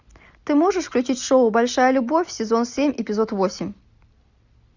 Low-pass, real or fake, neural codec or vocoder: 7.2 kHz; real; none